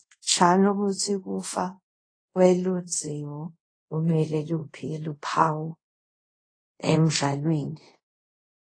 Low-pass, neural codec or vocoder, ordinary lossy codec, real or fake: 9.9 kHz; codec, 24 kHz, 0.5 kbps, DualCodec; AAC, 32 kbps; fake